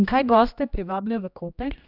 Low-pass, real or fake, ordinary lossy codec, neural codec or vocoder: 5.4 kHz; fake; none; codec, 16 kHz, 1 kbps, X-Codec, HuBERT features, trained on general audio